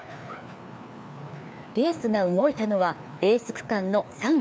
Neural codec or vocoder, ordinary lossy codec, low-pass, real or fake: codec, 16 kHz, 2 kbps, FreqCodec, larger model; none; none; fake